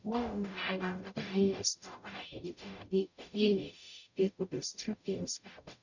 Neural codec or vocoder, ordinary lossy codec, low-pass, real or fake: codec, 44.1 kHz, 0.9 kbps, DAC; none; 7.2 kHz; fake